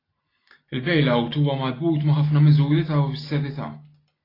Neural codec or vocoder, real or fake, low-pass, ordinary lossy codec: none; real; 5.4 kHz; AAC, 24 kbps